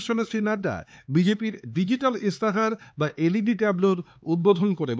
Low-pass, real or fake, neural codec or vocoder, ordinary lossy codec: none; fake; codec, 16 kHz, 4 kbps, X-Codec, HuBERT features, trained on LibriSpeech; none